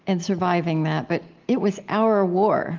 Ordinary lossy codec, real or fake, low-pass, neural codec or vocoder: Opus, 32 kbps; real; 7.2 kHz; none